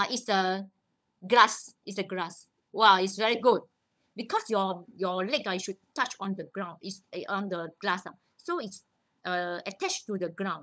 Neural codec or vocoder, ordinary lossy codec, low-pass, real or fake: codec, 16 kHz, 16 kbps, FunCodec, trained on LibriTTS, 50 frames a second; none; none; fake